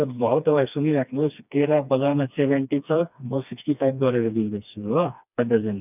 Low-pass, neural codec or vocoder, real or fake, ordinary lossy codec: 3.6 kHz; codec, 16 kHz, 2 kbps, FreqCodec, smaller model; fake; none